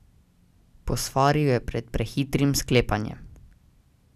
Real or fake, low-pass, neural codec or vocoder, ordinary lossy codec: real; 14.4 kHz; none; none